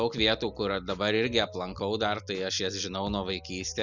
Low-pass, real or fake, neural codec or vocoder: 7.2 kHz; real; none